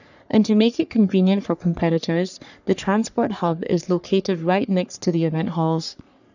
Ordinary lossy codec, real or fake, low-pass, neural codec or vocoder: none; fake; 7.2 kHz; codec, 44.1 kHz, 3.4 kbps, Pupu-Codec